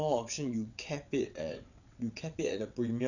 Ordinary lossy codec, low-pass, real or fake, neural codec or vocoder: none; 7.2 kHz; fake; vocoder, 22.05 kHz, 80 mel bands, WaveNeXt